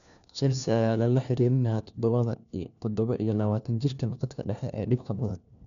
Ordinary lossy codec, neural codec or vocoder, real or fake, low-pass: none; codec, 16 kHz, 1 kbps, FunCodec, trained on LibriTTS, 50 frames a second; fake; 7.2 kHz